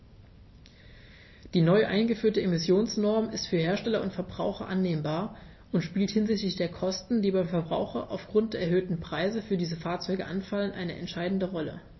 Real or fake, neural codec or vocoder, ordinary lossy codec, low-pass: real; none; MP3, 24 kbps; 7.2 kHz